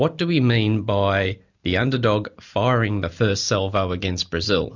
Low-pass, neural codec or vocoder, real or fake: 7.2 kHz; none; real